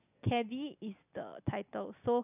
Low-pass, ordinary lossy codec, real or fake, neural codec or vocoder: 3.6 kHz; none; real; none